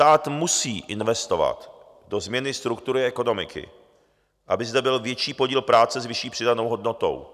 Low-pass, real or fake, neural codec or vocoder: 14.4 kHz; real; none